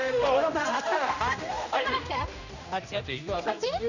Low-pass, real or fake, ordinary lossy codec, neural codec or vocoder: 7.2 kHz; fake; none; codec, 16 kHz, 1 kbps, X-Codec, HuBERT features, trained on general audio